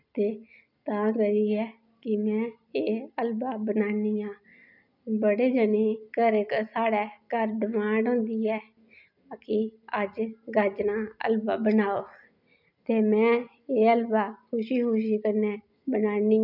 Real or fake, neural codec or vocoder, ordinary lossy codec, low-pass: real; none; none; 5.4 kHz